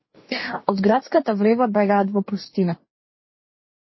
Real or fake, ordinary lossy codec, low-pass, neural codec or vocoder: fake; MP3, 24 kbps; 7.2 kHz; codec, 44.1 kHz, 2.6 kbps, DAC